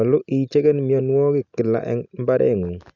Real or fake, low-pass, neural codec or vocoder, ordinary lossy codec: real; 7.2 kHz; none; none